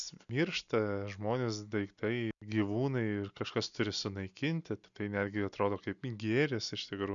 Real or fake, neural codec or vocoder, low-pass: real; none; 7.2 kHz